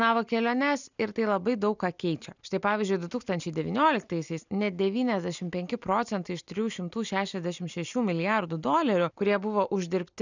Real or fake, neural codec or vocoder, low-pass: real; none; 7.2 kHz